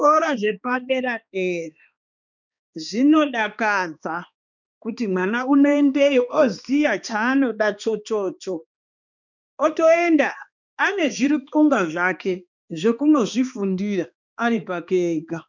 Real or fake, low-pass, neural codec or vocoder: fake; 7.2 kHz; codec, 16 kHz, 2 kbps, X-Codec, HuBERT features, trained on balanced general audio